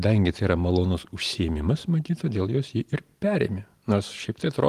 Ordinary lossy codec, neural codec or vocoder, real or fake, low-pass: Opus, 24 kbps; none; real; 14.4 kHz